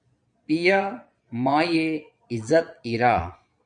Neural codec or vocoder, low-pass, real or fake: vocoder, 22.05 kHz, 80 mel bands, Vocos; 9.9 kHz; fake